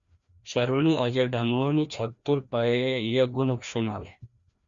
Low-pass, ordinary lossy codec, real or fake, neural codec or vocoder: 7.2 kHz; Opus, 64 kbps; fake; codec, 16 kHz, 1 kbps, FreqCodec, larger model